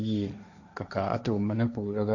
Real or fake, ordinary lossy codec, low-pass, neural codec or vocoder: fake; none; 7.2 kHz; codec, 16 kHz, 1.1 kbps, Voila-Tokenizer